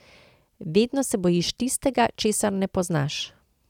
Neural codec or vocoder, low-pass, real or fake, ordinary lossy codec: none; 19.8 kHz; real; none